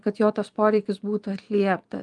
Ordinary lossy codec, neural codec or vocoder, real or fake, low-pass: Opus, 32 kbps; vocoder, 24 kHz, 100 mel bands, Vocos; fake; 10.8 kHz